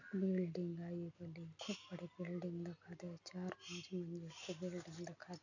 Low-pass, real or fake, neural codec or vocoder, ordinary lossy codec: 7.2 kHz; real; none; none